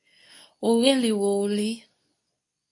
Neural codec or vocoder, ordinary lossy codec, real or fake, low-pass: codec, 24 kHz, 0.9 kbps, WavTokenizer, medium speech release version 2; MP3, 48 kbps; fake; 10.8 kHz